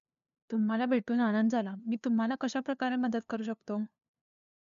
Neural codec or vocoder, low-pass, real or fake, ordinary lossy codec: codec, 16 kHz, 2 kbps, FunCodec, trained on LibriTTS, 25 frames a second; 7.2 kHz; fake; none